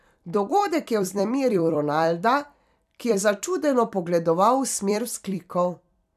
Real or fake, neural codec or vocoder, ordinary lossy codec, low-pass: fake; vocoder, 44.1 kHz, 128 mel bands every 256 samples, BigVGAN v2; none; 14.4 kHz